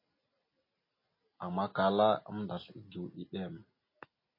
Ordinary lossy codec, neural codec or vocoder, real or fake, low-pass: MP3, 24 kbps; none; real; 5.4 kHz